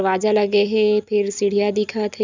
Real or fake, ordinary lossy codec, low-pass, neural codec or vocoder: real; none; 7.2 kHz; none